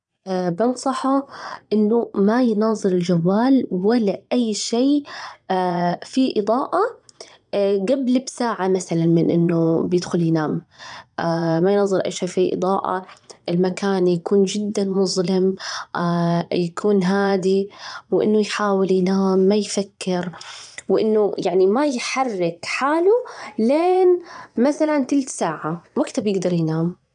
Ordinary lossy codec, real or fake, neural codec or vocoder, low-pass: none; fake; vocoder, 22.05 kHz, 80 mel bands, Vocos; 9.9 kHz